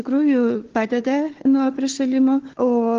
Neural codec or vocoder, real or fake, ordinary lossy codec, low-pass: codec, 16 kHz, 4 kbps, FunCodec, trained on Chinese and English, 50 frames a second; fake; Opus, 16 kbps; 7.2 kHz